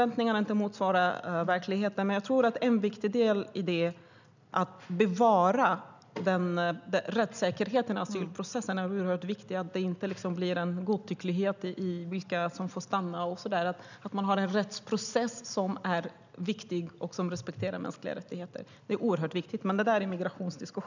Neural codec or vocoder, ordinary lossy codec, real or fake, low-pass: none; none; real; 7.2 kHz